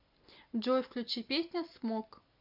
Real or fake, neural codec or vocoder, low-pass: real; none; 5.4 kHz